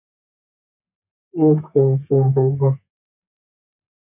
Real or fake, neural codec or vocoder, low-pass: fake; codec, 32 kHz, 1.9 kbps, SNAC; 3.6 kHz